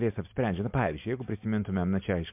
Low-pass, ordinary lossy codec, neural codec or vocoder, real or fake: 3.6 kHz; MP3, 32 kbps; none; real